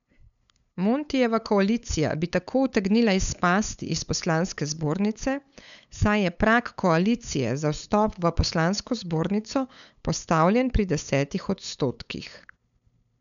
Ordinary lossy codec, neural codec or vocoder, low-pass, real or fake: none; codec, 16 kHz, 8 kbps, FunCodec, trained on LibriTTS, 25 frames a second; 7.2 kHz; fake